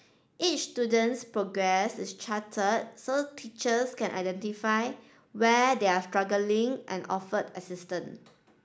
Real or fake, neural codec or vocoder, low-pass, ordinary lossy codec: real; none; none; none